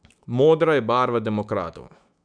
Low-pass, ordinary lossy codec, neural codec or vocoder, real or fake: 9.9 kHz; MP3, 96 kbps; autoencoder, 48 kHz, 128 numbers a frame, DAC-VAE, trained on Japanese speech; fake